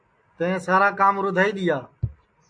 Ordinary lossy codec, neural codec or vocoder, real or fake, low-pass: AAC, 64 kbps; none; real; 9.9 kHz